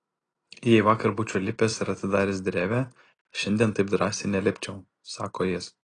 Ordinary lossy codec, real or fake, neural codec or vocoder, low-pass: AAC, 32 kbps; real; none; 9.9 kHz